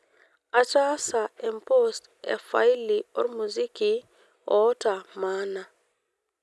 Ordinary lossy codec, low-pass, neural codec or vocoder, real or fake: none; none; none; real